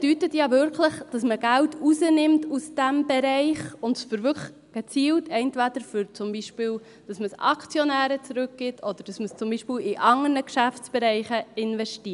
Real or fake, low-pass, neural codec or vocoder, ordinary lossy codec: real; 10.8 kHz; none; none